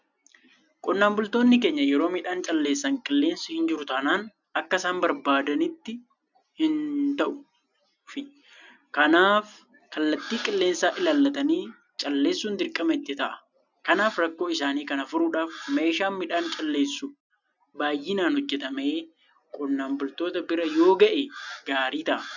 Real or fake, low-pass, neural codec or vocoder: real; 7.2 kHz; none